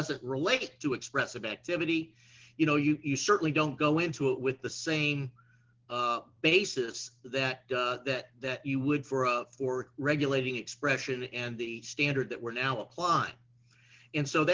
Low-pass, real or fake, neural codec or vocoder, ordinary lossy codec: 7.2 kHz; real; none; Opus, 16 kbps